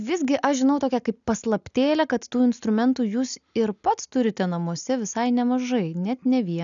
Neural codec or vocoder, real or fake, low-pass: none; real; 7.2 kHz